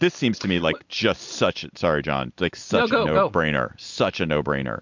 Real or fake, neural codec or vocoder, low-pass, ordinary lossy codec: real; none; 7.2 kHz; MP3, 64 kbps